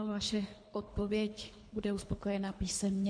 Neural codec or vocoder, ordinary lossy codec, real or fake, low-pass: codec, 24 kHz, 3 kbps, HILCodec; MP3, 48 kbps; fake; 9.9 kHz